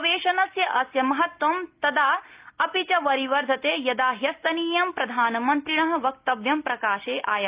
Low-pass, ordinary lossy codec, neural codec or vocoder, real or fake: 3.6 kHz; Opus, 32 kbps; none; real